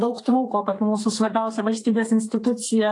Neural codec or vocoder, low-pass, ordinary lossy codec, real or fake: codec, 32 kHz, 1.9 kbps, SNAC; 10.8 kHz; AAC, 48 kbps; fake